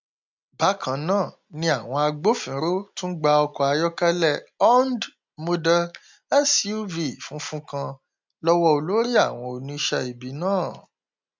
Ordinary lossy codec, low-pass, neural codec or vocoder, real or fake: MP3, 64 kbps; 7.2 kHz; none; real